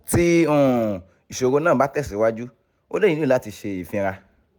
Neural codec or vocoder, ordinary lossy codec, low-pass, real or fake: none; none; 19.8 kHz; real